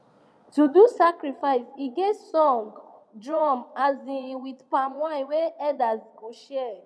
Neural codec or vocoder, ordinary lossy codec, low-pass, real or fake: vocoder, 22.05 kHz, 80 mel bands, Vocos; none; none; fake